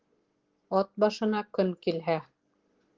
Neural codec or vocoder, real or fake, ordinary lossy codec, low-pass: none; real; Opus, 16 kbps; 7.2 kHz